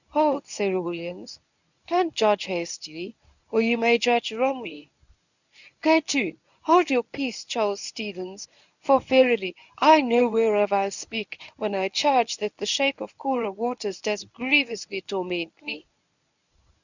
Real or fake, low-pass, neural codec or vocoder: fake; 7.2 kHz; codec, 24 kHz, 0.9 kbps, WavTokenizer, medium speech release version 1